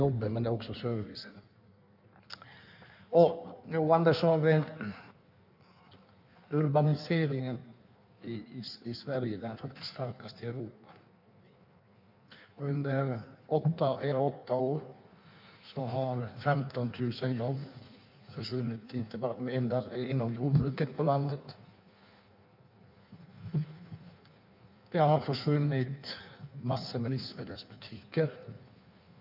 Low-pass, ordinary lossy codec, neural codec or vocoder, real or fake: 5.4 kHz; none; codec, 16 kHz in and 24 kHz out, 1.1 kbps, FireRedTTS-2 codec; fake